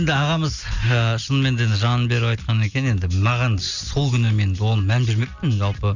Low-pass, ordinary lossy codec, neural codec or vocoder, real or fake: 7.2 kHz; none; none; real